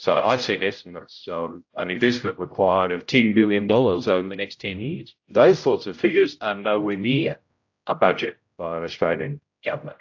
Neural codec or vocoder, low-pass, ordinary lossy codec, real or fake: codec, 16 kHz, 0.5 kbps, X-Codec, HuBERT features, trained on general audio; 7.2 kHz; AAC, 48 kbps; fake